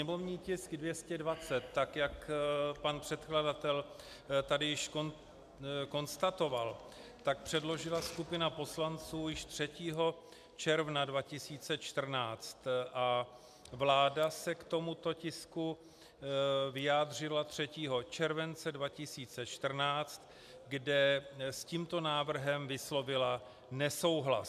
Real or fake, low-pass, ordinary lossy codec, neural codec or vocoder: real; 14.4 kHz; MP3, 96 kbps; none